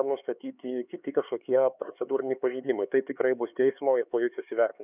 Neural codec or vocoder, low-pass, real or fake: codec, 16 kHz, 4 kbps, X-Codec, HuBERT features, trained on LibriSpeech; 3.6 kHz; fake